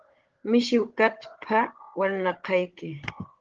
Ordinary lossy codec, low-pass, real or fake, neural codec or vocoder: Opus, 16 kbps; 7.2 kHz; fake; codec, 16 kHz, 16 kbps, FunCodec, trained on Chinese and English, 50 frames a second